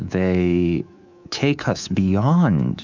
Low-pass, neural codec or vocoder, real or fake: 7.2 kHz; codec, 24 kHz, 3.1 kbps, DualCodec; fake